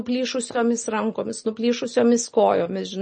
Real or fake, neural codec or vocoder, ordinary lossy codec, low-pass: real; none; MP3, 32 kbps; 10.8 kHz